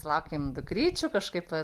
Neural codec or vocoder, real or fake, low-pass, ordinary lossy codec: none; real; 14.4 kHz; Opus, 24 kbps